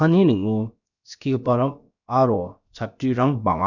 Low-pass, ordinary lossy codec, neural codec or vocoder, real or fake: 7.2 kHz; none; codec, 16 kHz, about 1 kbps, DyCAST, with the encoder's durations; fake